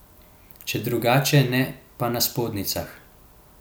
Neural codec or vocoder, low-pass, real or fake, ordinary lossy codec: none; none; real; none